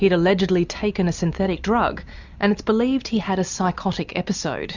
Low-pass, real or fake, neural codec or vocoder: 7.2 kHz; real; none